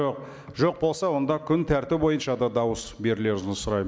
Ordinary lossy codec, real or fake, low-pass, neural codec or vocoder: none; real; none; none